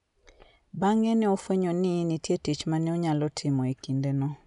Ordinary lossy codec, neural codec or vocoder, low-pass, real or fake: none; none; 10.8 kHz; real